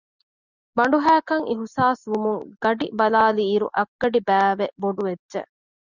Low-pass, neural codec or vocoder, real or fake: 7.2 kHz; none; real